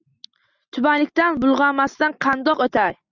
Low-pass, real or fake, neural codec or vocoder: 7.2 kHz; real; none